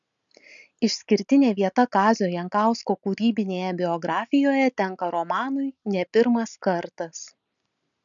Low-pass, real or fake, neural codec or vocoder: 7.2 kHz; real; none